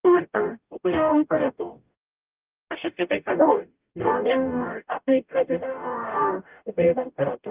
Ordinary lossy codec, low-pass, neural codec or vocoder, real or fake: Opus, 32 kbps; 3.6 kHz; codec, 44.1 kHz, 0.9 kbps, DAC; fake